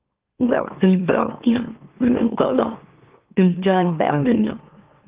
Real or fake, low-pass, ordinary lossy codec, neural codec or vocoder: fake; 3.6 kHz; Opus, 32 kbps; autoencoder, 44.1 kHz, a latent of 192 numbers a frame, MeloTTS